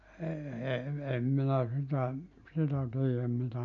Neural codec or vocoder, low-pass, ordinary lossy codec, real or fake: none; 7.2 kHz; none; real